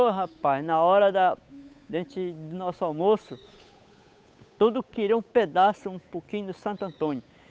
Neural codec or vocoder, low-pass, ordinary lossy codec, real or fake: codec, 16 kHz, 8 kbps, FunCodec, trained on Chinese and English, 25 frames a second; none; none; fake